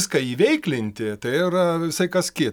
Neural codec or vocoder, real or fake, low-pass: none; real; 19.8 kHz